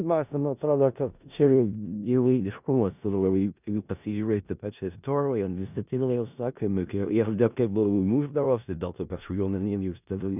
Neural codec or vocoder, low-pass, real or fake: codec, 16 kHz in and 24 kHz out, 0.4 kbps, LongCat-Audio-Codec, four codebook decoder; 3.6 kHz; fake